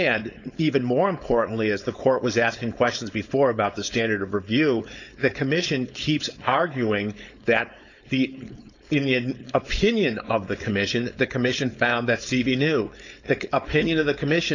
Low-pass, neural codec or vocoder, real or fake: 7.2 kHz; codec, 16 kHz, 4.8 kbps, FACodec; fake